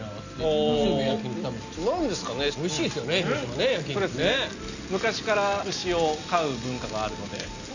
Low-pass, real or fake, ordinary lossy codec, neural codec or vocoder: 7.2 kHz; real; none; none